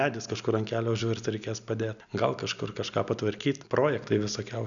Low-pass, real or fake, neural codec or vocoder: 7.2 kHz; real; none